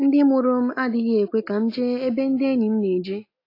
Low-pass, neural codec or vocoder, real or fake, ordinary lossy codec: 5.4 kHz; none; real; AAC, 32 kbps